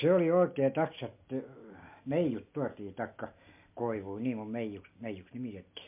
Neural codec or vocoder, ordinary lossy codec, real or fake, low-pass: none; MP3, 32 kbps; real; 3.6 kHz